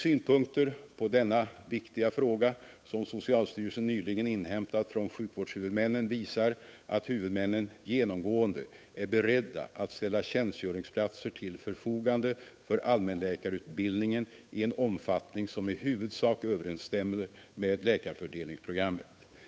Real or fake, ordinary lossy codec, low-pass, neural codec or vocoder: fake; none; none; codec, 16 kHz, 8 kbps, FunCodec, trained on Chinese and English, 25 frames a second